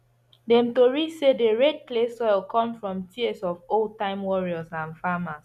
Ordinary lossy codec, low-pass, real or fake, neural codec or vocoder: none; 14.4 kHz; real; none